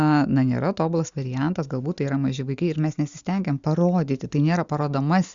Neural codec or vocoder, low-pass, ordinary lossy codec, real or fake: none; 7.2 kHz; Opus, 64 kbps; real